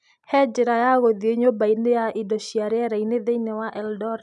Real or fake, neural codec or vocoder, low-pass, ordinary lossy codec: real; none; 10.8 kHz; none